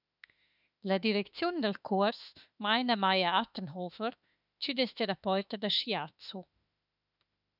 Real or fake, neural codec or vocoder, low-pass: fake; autoencoder, 48 kHz, 32 numbers a frame, DAC-VAE, trained on Japanese speech; 5.4 kHz